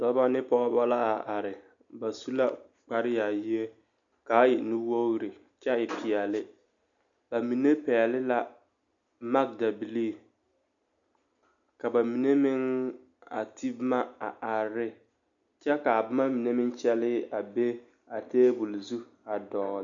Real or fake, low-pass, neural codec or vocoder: real; 7.2 kHz; none